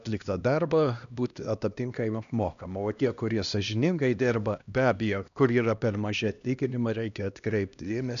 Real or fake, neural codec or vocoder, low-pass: fake; codec, 16 kHz, 1 kbps, X-Codec, HuBERT features, trained on LibriSpeech; 7.2 kHz